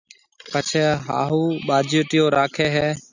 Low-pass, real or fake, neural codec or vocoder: 7.2 kHz; real; none